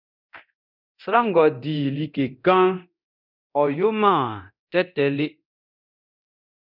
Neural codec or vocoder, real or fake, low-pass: codec, 24 kHz, 0.9 kbps, DualCodec; fake; 5.4 kHz